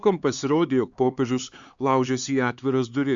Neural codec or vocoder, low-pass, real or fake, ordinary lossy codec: codec, 16 kHz, 4 kbps, X-Codec, HuBERT features, trained on LibriSpeech; 7.2 kHz; fake; Opus, 64 kbps